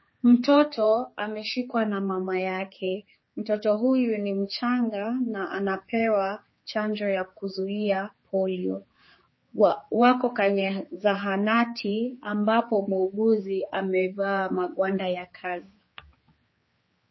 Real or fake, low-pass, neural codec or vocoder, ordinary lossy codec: fake; 7.2 kHz; codec, 16 kHz, 4 kbps, X-Codec, HuBERT features, trained on general audio; MP3, 24 kbps